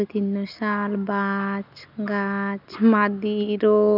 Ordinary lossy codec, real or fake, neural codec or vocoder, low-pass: none; real; none; 5.4 kHz